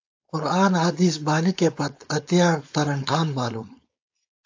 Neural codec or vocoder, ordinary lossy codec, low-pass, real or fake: codec, 16 kHz, 4.8 kbps, FACodec; AAC, 48 kbps; 7.2 kHz; fake